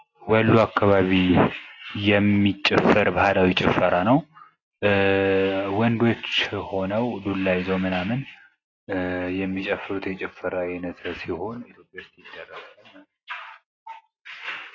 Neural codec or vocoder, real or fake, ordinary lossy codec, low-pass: none; real; AAC, 32 kbps; 7.2 kHz